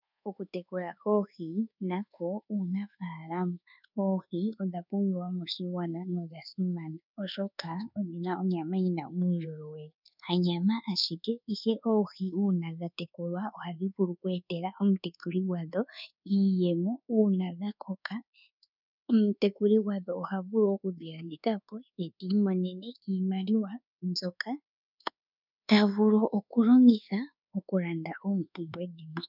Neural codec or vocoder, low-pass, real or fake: codec, 24 kHz, 1.2 kbps, DualCodec; 5.4 kHz; fake